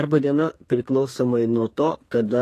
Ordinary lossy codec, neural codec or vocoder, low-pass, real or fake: AAC, 48 kbps; codec, 32 kHz, 1.9 kbps, SNAC; 14.4 kHz; fake